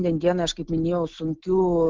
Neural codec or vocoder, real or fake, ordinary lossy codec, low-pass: none; real; Opus, 16 kbps; 7.2 kHz